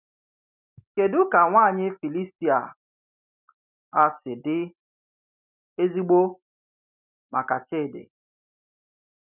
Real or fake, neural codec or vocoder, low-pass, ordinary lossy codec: real; none; 3.6 kHz; none